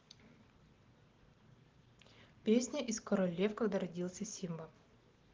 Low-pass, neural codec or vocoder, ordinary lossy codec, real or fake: 7.2 kHz; none; Opus, 16 kbps; real